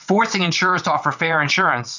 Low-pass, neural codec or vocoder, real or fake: 7.2 kHz; vocoder, 22.05 kHz, 80 mel bands, WaveNeXt; fake